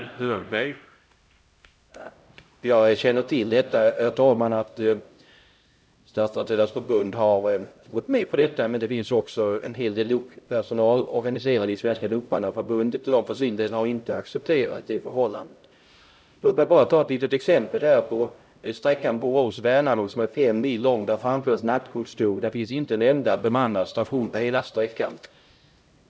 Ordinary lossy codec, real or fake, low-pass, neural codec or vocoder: none; fake; none; codec, 16 kHz, 0.5 kbps, X-Codec, HuBERT features, trained on LibriSpeech